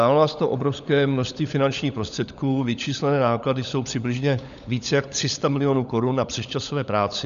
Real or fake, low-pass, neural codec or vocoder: fake; 7.2 kHz; codec, 16 kHz, 16 kbps, FunCodec, trained on LibriTTS, 50 frames a second